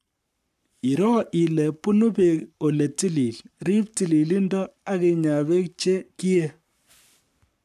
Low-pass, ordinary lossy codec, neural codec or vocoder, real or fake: 14.4 kHz; none; codec, 44.1 kHz, 7.8 kbps, Pupu-Codec; fake